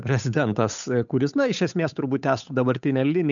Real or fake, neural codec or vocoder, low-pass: fake; codec, 16 kHz, 8 kbps, FunCodec, trained on Chinese and English, 25 frames a second; 7.2 kHz